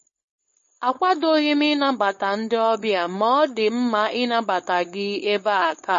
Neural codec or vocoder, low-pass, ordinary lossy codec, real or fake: codec, 16 kHz, 4.8 kbps, FACodec; 7.2 kHz; MP3, 32 kbps; fake